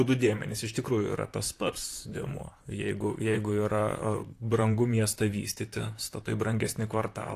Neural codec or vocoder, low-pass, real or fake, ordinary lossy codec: vocoder, 44.1 kHz, 128 mel bands, Pupu-Vocoder; 14.4 kHz; fake; AAC, 64 kbps